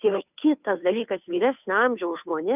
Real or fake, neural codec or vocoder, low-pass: fake; codec, 16 kHz, 2 kbps, FunCodec, trained on Chinese and English, 25 frames a second; 3.6 kHz